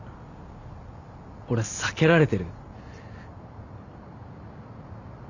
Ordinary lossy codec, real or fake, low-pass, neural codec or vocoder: none; real; 7.2 kHz; none